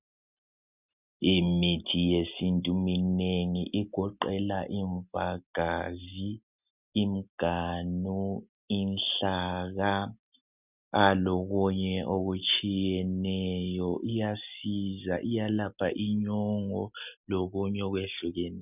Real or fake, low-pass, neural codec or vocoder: real; 3.6 kHz; none